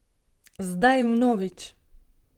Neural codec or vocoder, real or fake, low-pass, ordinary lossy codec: vocoder, 44.1 kHz, 128 mel bands, Pupu-Vocoder; fake; 19.8 kHz; Opus, 24 kbps